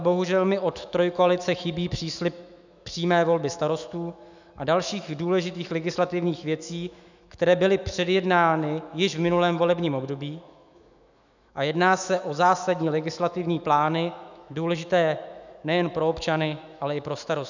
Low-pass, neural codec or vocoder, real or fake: 7.2 kHz; autoencoder, 48 kHz, 128 numbers a frame, DAC-VAE, trained on Japanese speech; fake